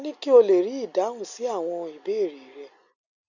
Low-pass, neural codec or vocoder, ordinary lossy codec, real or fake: 7.2 kHz; none; none; real